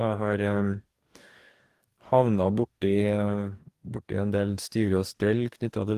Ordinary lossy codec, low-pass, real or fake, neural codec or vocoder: Opus, 24 kbps; 14.4 kHz; fake; codec, 44.1 kHz, 2.6 kbps, DAC